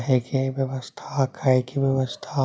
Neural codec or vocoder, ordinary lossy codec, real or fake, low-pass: none; none; real; none